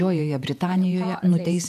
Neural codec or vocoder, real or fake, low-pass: vocoder, 48 kHz, 128 mel bands, Vocos; fake; 14.4 kHz